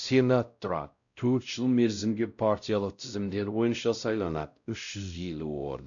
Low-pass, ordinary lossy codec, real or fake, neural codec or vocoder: 7.2 kHz; none; fake; codec, 16 kHz, 0.5 kbps, X-Codec, WavLM features, trained on Multilingual LibriSpeech